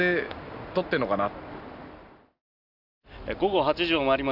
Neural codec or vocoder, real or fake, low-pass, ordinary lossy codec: none; real; 5.4 kHz; none